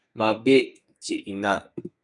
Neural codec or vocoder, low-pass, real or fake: codec, 32 kHz, 1.9 kbps, SNAC; 10.8 kHz; fake